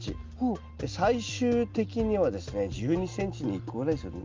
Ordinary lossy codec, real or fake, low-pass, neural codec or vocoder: Opus, 24 kbps; real; 7.2 kHz; none